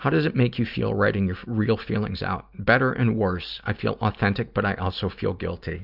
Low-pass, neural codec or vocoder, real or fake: 5.4 kHz; none; real